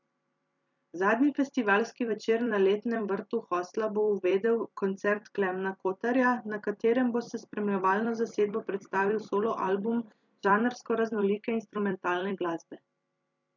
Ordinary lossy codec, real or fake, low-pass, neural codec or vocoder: none; fake; 7.2 kHz; vocoder, 44.1 kHz, 128 mel bands every 256 samples, BigVGAN v2